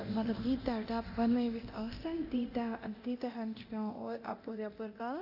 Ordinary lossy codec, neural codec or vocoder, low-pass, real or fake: none; codec, 24 kHz, 0.9 kbps, DualCodec; 5.4 kHz; fake